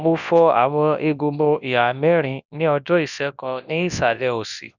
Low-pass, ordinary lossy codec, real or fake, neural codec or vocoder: 7.2 kHz; none; fake; codec, 24 kHz, 0.9 kbps, WavTokenizer, large speech release